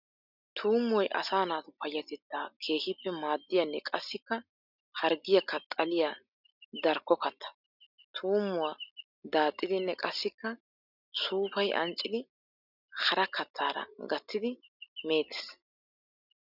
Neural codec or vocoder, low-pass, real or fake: none; 5.4 kHz; real